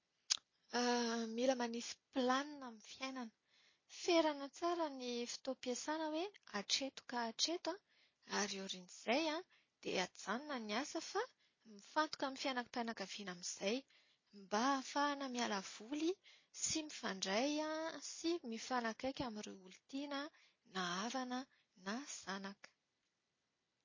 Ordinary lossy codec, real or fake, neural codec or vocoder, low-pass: MP3, 32 kbps; real; none; 7.2 kHz